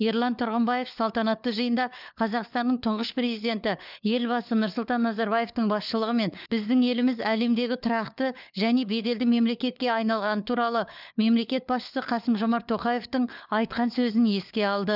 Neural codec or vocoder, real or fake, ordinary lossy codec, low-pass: codec, 16 kHz, 4 kbps, FunCodec, trained on LibriTTS, 50 frames a second; fake; none; 5.4 kHz